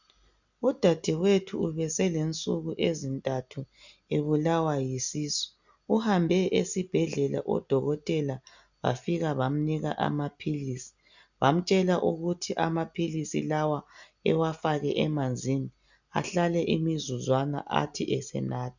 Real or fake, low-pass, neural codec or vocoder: real; 7.2 kHz; none